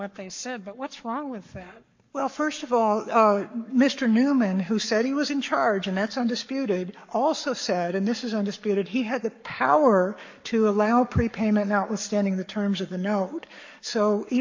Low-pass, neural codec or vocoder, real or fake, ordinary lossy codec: 7.2 kHz; codec, 44.1 kHz, 7.8 kbps, Pupu-Codec; fake; MP3, 48 kbps